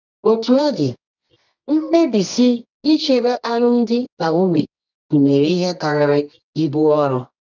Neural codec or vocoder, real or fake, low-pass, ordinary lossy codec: codec, 24 kHz, 0.9 kbps, WavTokenizer, medium music audio release; fake; 7.2 kHz; none